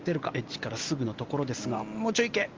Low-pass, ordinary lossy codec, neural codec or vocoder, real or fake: 7.2 kHz; Opus, 32 kbps; none; real